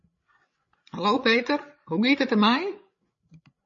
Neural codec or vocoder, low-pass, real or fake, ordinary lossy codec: codec, 16 kHz, 16 kbps, FreqCodec, larger model; 7.2 kHz; fake; MP3, 32 kbps